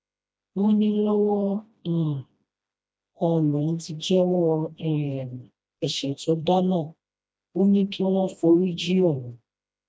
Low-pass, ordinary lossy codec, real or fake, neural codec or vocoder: none; none; fake; codec, 16 kHz, 1 kbps, FreqCodec, smaller model